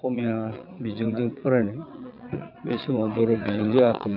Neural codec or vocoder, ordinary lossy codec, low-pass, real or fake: vocoder, 22.05 kHz, 80 mel bands, WaveNeXt; none; 5.4 kHz; fake